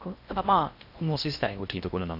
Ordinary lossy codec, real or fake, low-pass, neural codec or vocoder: none; fake; 5.4 kHz; codec, 16 kHz in and 24 kHz out, 0.6 kbps, FocalCodec, streaming, 4096 codes